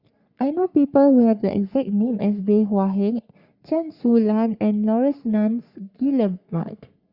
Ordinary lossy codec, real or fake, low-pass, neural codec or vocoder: Opus, 64 kbps; fake; 5.4 kHz; codec, 44.1 kHz, 3.4 kbps, Pupu-Codec